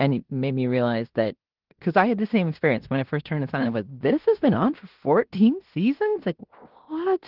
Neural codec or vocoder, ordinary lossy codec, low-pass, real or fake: codec, 16 kHz in and 24 kHz out, 0.9 kbps, LongCat-Audio-Codec, fine tuned four codebook decoder; Opus, 16 kbps; 5.4 kHz; fake